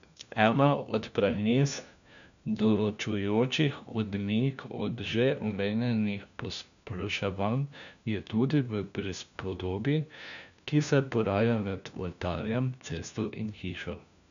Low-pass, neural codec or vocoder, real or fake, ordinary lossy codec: 7.2 kHz; codec, 16 kHz, 1 kbps, FunCodec, trained on LibriTTS, 50 frames a second; fake; none